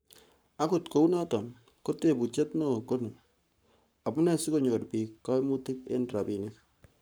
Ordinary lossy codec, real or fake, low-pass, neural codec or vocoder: none; fake; none; codec, 44.1 kHz, 7.8 kbps, Pupu-Codec